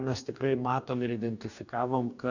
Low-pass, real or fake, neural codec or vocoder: 7.2 kHz; fake; codec, 44.1 kHz, 2.6 kbps, DAC